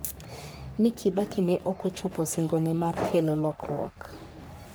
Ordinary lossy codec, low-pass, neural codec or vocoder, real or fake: none; none; codec, 44.1 kHz, 3.4 kbps, Pupu-Codec; fake